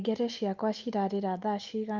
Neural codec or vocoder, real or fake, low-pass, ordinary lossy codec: none; real; 7.2 kHz; Opus, 24 kbps